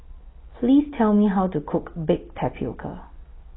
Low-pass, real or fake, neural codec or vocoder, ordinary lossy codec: 7.2 kHz; real; none; AAC, 16 kbps